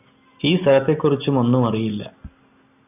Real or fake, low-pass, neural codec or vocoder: real; 3.6 kHz; none